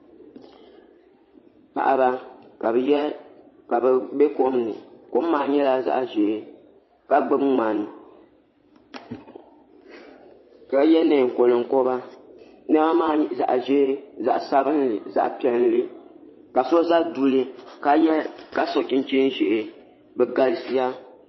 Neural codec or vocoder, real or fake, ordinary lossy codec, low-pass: vocoder, 22.05 kHz, 80 mel bands, Vocos; fake; MP3, 24 kbps; 7.2 kHz